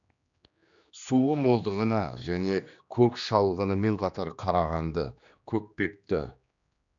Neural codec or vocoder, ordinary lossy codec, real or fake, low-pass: codec, 16 kHz, 2 kbps, X-Codec, HuBERT features, trained on general audio; none; fake; 7.2 kHz